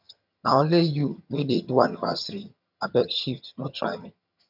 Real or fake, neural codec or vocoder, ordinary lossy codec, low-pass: fake; vocoder, 22.05 kHz, 80 mel bands, HiFi-GAN; none; 5.4 kHz